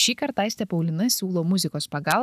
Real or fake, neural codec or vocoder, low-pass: real; none; 14.4 kHz